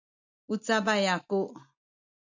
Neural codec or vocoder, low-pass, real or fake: none; 7.2 kHz; real